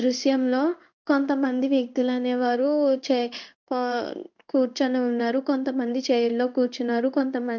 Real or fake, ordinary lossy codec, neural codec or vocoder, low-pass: fake; none; codec, 16 kHz in and 24 kHz out, 1 kbps, XY-Tokenizer; 7.2 kHz